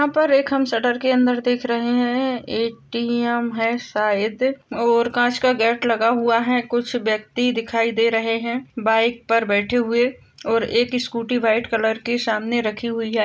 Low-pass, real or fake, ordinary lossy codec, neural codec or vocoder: none; real; none; none